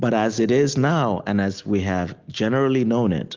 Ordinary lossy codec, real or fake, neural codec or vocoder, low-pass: Opus, 32 kbps; real; none; 7.2 kHz